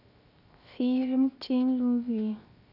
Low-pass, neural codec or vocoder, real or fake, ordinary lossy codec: 5.4 kHz; codec, 16 kHz, 0.7 kbps, FocalCodec; fake; none